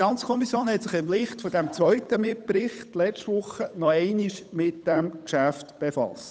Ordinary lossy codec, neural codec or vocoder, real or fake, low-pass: none; codec, 16 kHz, 8 kbps, FunCodec, trained on Chinese and English, 25 frames a second; fake; none